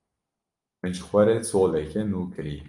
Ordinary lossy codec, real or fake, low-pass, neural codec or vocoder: Opus, 32 kbps; real; 10.8 kHz; none